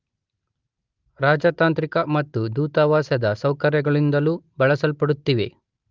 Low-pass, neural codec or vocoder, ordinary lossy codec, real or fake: 7.2 kHz; none; Opus, 32 kbps; real